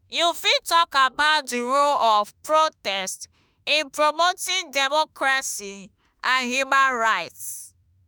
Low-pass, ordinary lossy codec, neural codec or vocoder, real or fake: none; none; autoencoder, 48 kHz, 32 numbers a frame, DAC-VAE, trained on Japanese speech; fake